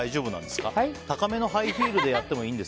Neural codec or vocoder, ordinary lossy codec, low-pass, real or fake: none; none; none; real